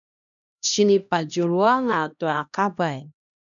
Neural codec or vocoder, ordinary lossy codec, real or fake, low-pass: codec, 16 kHz, 1 kbps, X-Codec, HuBERT features, trained on LibriSpeech; MP3, 96 kbps; fake; 7.2 kHz